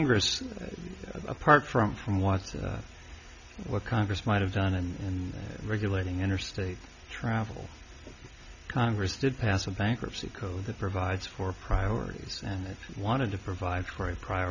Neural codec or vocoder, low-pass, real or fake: none; 7.2 kHz; real